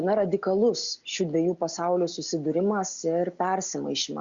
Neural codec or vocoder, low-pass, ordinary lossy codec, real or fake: none; 7.2 kHz; Opus, 64 kbps; real